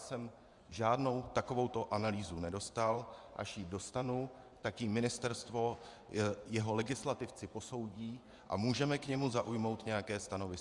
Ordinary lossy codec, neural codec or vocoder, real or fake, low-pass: Opus, 64 kbps; vocoder, 24 kHz, 100 mel bands, Vocos; fake; 10.8 kHz